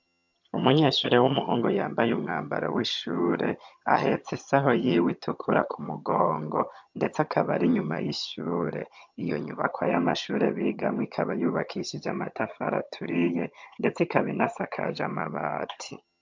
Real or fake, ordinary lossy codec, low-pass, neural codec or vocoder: fake; MP3, 64 kbps; 7.2 kHz; vocoder, 22.05 kHz, 80 mel bands, HiFi-GAN